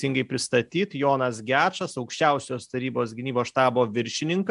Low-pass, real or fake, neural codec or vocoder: 10.8 kHz; real; none